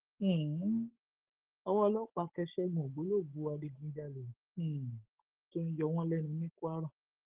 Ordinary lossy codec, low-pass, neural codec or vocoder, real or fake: Opus, 16 kbps; 3.6 kHz; codec, 44.1 kHz, 7.8 kbps, Pupu-Codec; fake